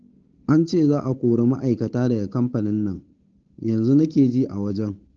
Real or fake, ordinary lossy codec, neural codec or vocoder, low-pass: fake; Opus, 16 kbps; codec, 16 kHz, 8 kbps, FunCodec, trained on Chinese and English, 25 frames a second; 7.2 kHz